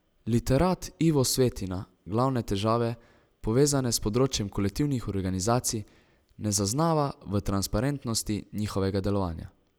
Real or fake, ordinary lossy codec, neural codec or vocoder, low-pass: real; none; none; none